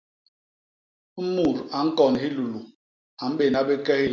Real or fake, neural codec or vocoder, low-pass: real; none; 7.2 kHz